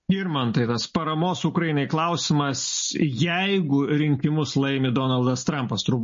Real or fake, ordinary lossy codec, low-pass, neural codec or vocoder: real; MP3, 32 kbps; 7.2 kHz; none